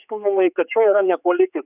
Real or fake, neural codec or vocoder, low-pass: fake; codec, 16 kHz, 4 kbps, X-Codec, HuBERT features, trained on general audio; 3.6 kHz